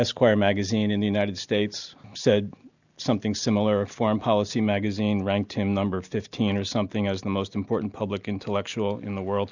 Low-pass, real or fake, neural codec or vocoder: 7.2 kHz; real; none